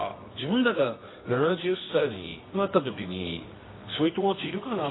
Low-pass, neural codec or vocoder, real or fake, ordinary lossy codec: 7.2 kHz; codec, 24 kHz, 0.9 kbps, WavTokenizer, medium music audio release; fake; AAC, 16 kbps